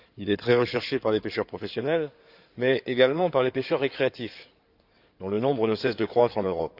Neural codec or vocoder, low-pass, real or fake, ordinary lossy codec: codec, 16 kHz in and 24 kHz out, 2.2 kbps, FireRedTTS-2 codec; 5.4 kHz; fake; none